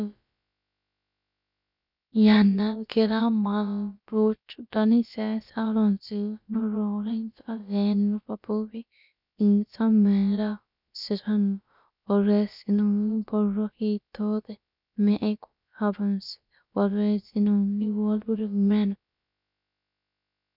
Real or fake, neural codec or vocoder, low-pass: fake; codec, 16 kHz, about 1 kbps, DyCAST, with the encoder's durations; 5.4 kHz